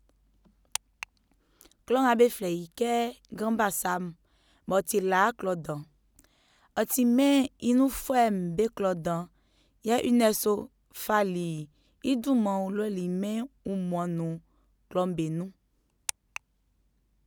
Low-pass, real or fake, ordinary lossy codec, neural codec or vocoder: none; real; none; none